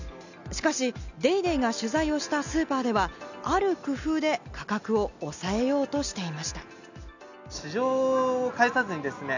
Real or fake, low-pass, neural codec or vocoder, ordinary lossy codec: real; 7.2 kHz; none; none